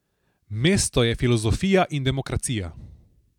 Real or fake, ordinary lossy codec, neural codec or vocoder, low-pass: real; none; none; 19.8 kHz